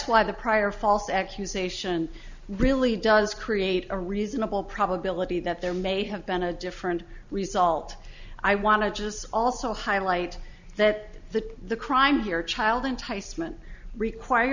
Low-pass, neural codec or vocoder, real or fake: 7.2 kHz; none; real